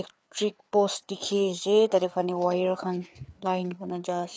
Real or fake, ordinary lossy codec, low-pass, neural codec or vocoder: fake; none; none; codec, 16 kHz, 4 kbps, FreqCodec, larger model